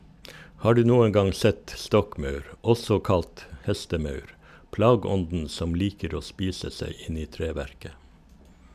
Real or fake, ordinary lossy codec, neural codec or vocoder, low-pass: real; MP3, 96 kbps; none; 14.4 kHz